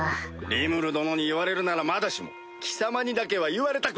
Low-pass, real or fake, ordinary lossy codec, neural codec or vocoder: none; real; none; none